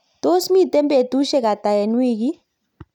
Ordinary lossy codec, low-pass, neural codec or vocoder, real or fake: none; 19.8 kHz; none; real